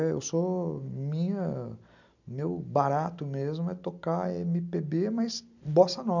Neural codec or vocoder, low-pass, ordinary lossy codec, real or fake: none; 7.2 kHz; none; real